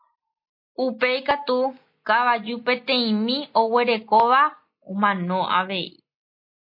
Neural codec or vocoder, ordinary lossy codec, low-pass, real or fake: none; MP3, 32 kbps; 5.4 kHz; real